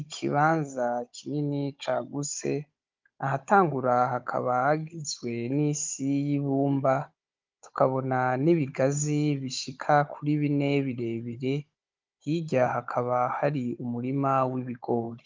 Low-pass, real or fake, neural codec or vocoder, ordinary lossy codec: 7.2 kHz; fake; codec, 16 kHz, 16 kbps, FunCodec, trained on Chinese and English, 50 frames a second; Opus, 24 kbps